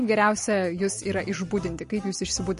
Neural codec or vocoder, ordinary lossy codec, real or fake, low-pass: none; MP3, 48 kbps; real; 10.8 kHz